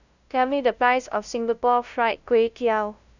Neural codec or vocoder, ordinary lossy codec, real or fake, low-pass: codec, 16 kHz, 0.5 kbps, FunCodec, trained on LibriTTS, 25 frames a second; none; fake; 7.2 kHz